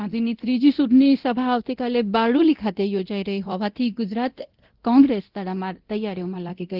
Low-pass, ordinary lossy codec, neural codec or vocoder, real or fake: 5.4 kHz; Opus, 16 kbps; codec, 24 kHz, 0.9 kbps, DualCodec; fake